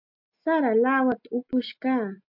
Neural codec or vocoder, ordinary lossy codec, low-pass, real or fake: none; MP3, 48 kbps; 5.4 kHz; real